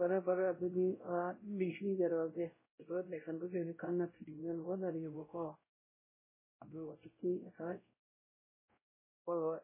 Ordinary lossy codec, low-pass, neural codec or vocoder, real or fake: MP3, 16 kbps; 3.6 kHz; codec, 16 kHz, 0.5 kbps, X-Codec, WavLM features, trained on Multilingual LibriSpeech; fake